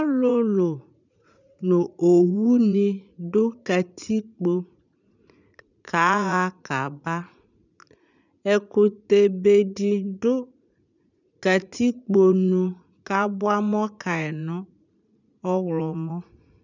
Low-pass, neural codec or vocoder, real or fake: 7.2 kHz; vocoder, 44.1 kHz, 80 mel bands, Vocos; fake